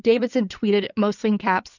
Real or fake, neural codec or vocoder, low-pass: fake; codec, 16 kHz in and 24 kHz out, 2.2 kbps, FireRedTTS-2 codec; 7.2 kHz